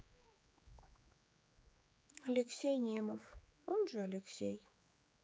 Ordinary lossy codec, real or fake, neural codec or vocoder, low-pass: none; fake; codec, 16 kHz, 4 kbps, X-Codec, HuBERT features, trained on general audio; none